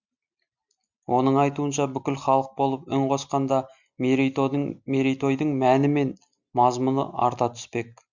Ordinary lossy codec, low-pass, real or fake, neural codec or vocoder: Opus, 64 kbps; 7.2 kHz; real; none